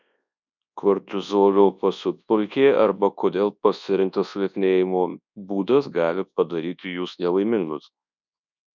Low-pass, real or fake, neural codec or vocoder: 7.2 kHz; fake; codec, 24 kHz, 0.9 kbps, WavTokenizer, large speech release